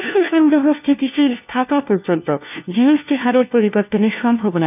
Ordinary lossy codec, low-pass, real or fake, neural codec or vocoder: none; 3.6 kHz; fake; codec, 16 kHz, 1 kbps, FunCodec, trained on LibriTTS, 50 frames a second